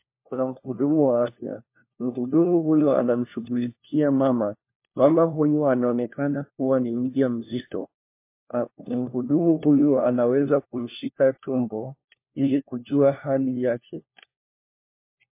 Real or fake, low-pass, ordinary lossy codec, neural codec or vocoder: fake; 3.6 kHz; MP3, 24 kbps; codec, 16 kHz, 1 kbps, FunCodec, trained on LibriTTS, 50 frames a second